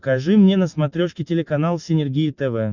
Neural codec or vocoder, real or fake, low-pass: none; real; 7.2 kHz